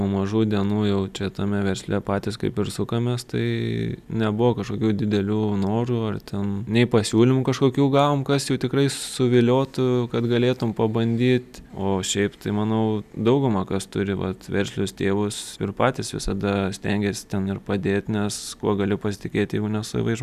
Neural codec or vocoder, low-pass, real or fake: none; 14.4 kHz; real